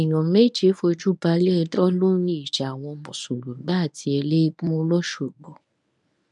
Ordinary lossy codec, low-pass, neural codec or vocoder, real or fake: none; 10.8 kHz; codec, 24 kHz, 0.9 kbps, WavTokenizer, medium speech release version 1; fake